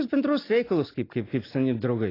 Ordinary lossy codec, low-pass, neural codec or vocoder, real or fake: AAC, 24 kbps; 5.4 kHz; none; real